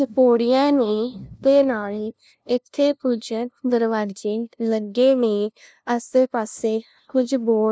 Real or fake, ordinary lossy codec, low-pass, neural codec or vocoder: fake; none; none; codec, 16 kHz, 0.5 kbps, FunCodec, trained on LibriTTS, 25 frames a second